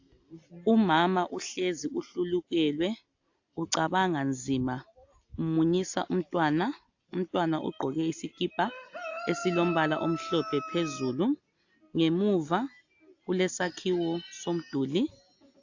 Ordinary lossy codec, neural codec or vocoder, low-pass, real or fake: AAC, 48 kbps; none; 7.2 kHz; real